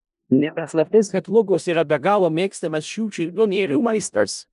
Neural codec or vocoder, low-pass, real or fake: codec, 16 kHz in and 24 kHz out, 0.4 kbps, LongCat-Audio-Codec, four codebook decoder; 10.8 kHz; fake